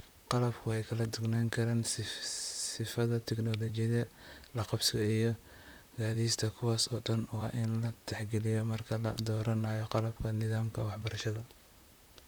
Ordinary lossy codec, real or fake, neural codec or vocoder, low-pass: none; fake; vocoder, 44.1 kHz, 128 mel bands, Pupu-Vocoder; none